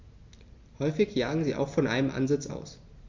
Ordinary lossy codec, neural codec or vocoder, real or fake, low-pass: MP3, 48 kbps; none; real; 7.2 kHz